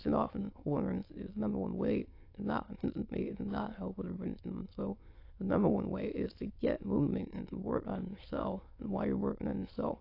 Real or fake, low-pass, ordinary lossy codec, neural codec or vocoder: fake; 5.4 kHz; AAC, 32 kbps; autoencoder, 22.05 kHz, a latent of 192 numbers a frame, VITS, trained on many speakers